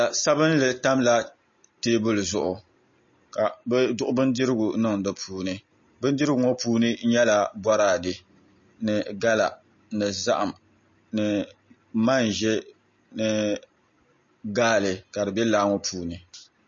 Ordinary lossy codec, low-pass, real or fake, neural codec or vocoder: MP3, 32 kbps; 7.2 kHz; real; none